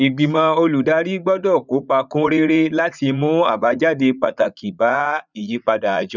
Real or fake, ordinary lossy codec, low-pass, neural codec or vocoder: fake; none; 7.2 kHz; vocoder, 44.1 kHz, 80 mel bands, Vocos